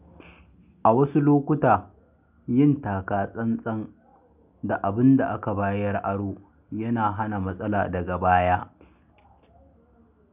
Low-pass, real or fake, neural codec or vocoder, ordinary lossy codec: 3.6 kHz; real; none; none